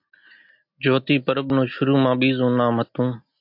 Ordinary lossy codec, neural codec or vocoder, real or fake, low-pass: MP3, 48 kbps; none; real; 5.4 kHz